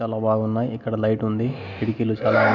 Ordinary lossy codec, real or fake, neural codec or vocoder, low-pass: none; real; none; 7.2 kHz